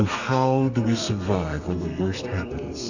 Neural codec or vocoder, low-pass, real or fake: codec, 32 kHz, 1.9 kbps, SNAC; 7.2 kHz; fake